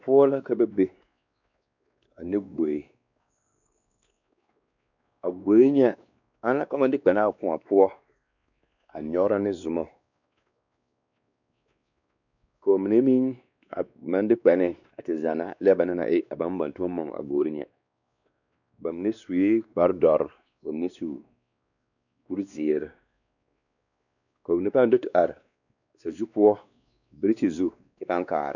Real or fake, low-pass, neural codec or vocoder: fake; 7.2 kHz; codec, 16 kHz, 2 kbps, X-Codec, WavLM features, trained on Multilingual LibriSpeech